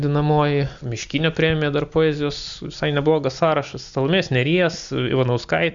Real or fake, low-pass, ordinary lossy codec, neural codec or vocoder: real; 7.2 kHz; MP3, 64 kbps; none